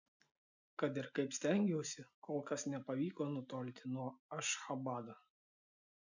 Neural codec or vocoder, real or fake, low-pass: none; real; 7.2 kHz